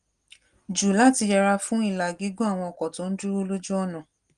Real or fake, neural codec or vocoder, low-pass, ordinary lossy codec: real; none; 9.9 kHz; Opus, 24 kbps